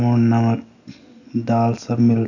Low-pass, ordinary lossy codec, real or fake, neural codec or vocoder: 7.2 kHz; none; real; none